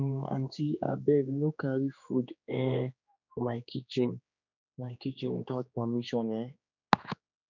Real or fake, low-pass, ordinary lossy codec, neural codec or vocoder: fake; 7.2 kHz; none; codec, 16 kHz, 2 kbps, X-Codec, HuBERT features, trained on general audio